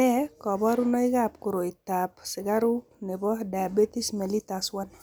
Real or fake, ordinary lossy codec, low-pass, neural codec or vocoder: real; none; none; none